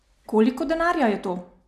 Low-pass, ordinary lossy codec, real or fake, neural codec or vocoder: 14.4 kHz; none; real; none